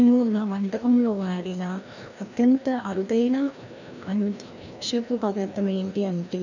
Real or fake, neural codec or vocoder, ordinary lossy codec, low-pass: fake; codec, 16 kHz, 1 kbps, FreqCodec, larger model; none; 7.2 kHz